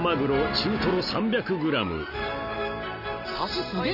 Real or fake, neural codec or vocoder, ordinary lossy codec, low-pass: real; none; none; 5.4 kHz